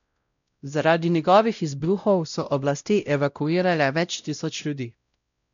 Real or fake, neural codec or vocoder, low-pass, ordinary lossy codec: fake; codec, 16 kHz, 0.5 kbps, X-Codec, WavLM features, trained on Multilingual LibriSpeech; 7.2 kHz; none